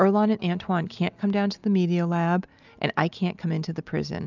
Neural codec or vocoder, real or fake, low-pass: none; real; 7.2 kHz